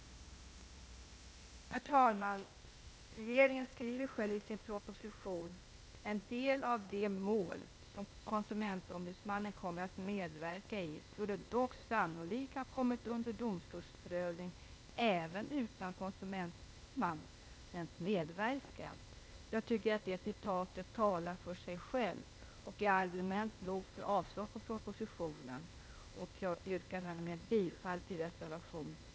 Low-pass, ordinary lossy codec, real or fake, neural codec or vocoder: none; none; fake; codec, 16 kHz, 0.8 kbps, ZipCodec